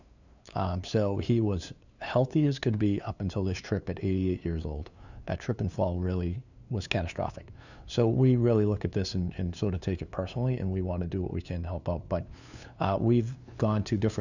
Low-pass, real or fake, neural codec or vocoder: 7.2 kHz; fake; codec, 16 kHz, 2 kbps, FunCodec, trained on LibriTTS, 25 frames a second